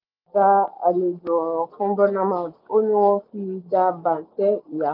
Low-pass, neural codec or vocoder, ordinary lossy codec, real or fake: 5.4 kHz; none; none; real